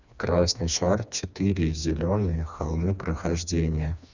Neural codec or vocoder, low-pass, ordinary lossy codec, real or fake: codec, 16 kHz, 2 kbps, FreqCodec, smaller model; 7.2 kHz; none; fake